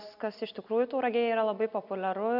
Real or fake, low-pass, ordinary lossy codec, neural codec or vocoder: real; 5.4 kHz; AAC, 48 kbps; none